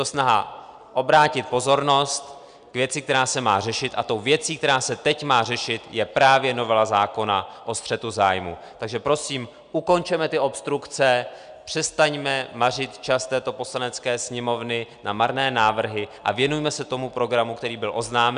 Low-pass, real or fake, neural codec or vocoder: 9.9 kHz; real; none